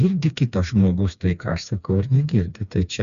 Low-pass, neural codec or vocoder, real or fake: 7.2 kHz; codec, 16 kHz, 2 kbps, FreqCodec, smaller model; fake